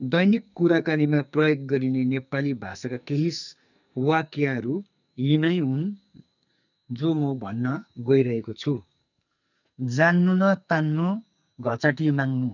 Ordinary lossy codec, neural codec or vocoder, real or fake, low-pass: none; codec, 44.1 kHz, 2.6 kbps, SNAC; fake; 7.2 kHz